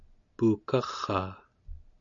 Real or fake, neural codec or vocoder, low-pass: real; none; 7.2 kHz